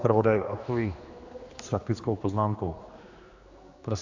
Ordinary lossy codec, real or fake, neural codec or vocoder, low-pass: AAC, 48 kbps; fake; codec, 16 kHz, 2 kbps, X-Codec, HuBERT features, trained on general audio; 7.2 kHz